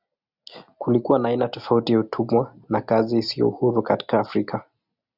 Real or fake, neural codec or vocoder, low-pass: real; none; 5.4 kHz